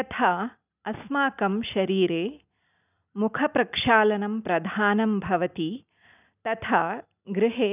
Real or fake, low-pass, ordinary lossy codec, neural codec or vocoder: real; 3.6 kHz; none; none